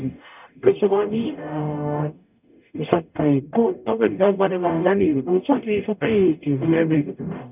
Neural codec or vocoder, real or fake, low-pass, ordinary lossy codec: codec, 44.1 kHz, 0.9 kbps, DAC; fake; 3.6 kHz; none